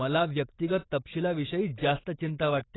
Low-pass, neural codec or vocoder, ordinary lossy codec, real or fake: 7.2 kHz; vocoder, 44.1 kHz, 128 mel bands every 256 samples, BigVGAN v2; AAC, 16 kbps; fake